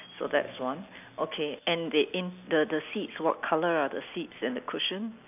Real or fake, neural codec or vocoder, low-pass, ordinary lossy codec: real; none; 3.6 kHz; none